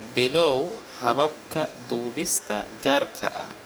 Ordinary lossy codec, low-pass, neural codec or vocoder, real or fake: none; none; codec, 44.1 kHz, 2.6 kbps, DAC; fake